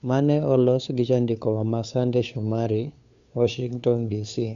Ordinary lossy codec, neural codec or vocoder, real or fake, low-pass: Opus, 64 kbps; codec, 16 kHz, 2 kbps, FunCodec, trained on Chinese and English, 25 frames a second; fake; 7.2 kHz